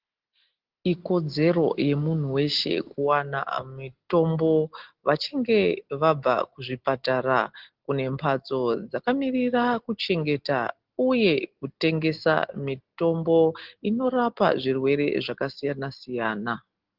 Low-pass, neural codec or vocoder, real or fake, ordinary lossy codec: 5.4 kHz; none; real; Opus, 16 kbps